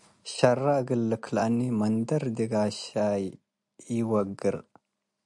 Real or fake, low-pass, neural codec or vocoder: real; 10.8 kHz; none